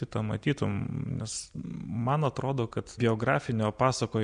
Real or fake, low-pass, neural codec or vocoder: real; 9.9 kHz; none